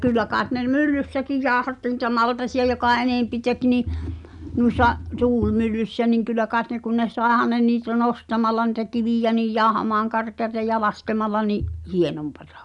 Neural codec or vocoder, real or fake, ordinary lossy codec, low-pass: none; real; none; 10.8 kHz